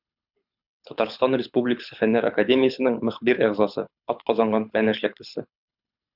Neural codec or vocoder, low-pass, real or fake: codec, 24 kHz, 6 kbps, HILCodec; 5.4 kHz; fake